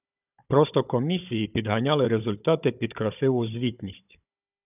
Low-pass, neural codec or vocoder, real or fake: 3.6 kHz; codec, 16 kHz, 16 kbps, FunCodec, trained on Chinese and English, 50 frames a second; fake